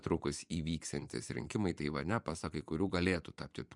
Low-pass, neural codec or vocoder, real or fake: 10.8 kHz; vocoder, 24 kHz, 100 mel bands, Vocos; fake